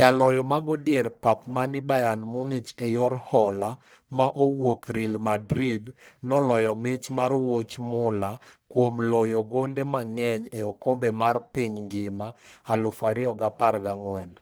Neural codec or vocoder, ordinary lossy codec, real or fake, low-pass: codec, 44.1 kHz, 1.7 kbps, Pupu-Codec; none; fake; none